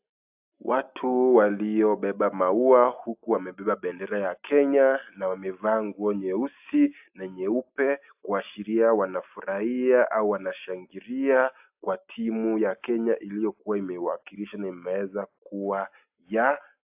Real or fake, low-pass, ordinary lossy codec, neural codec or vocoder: real; 3.6 kHz; AAC, 32 kbps; none